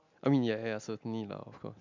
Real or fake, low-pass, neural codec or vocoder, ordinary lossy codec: real; 7.2 kHz; none; MP3, 64 kbps